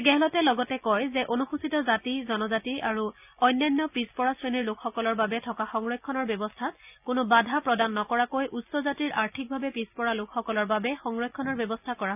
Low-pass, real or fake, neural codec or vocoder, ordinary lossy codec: 3.6 kHz; real; none; none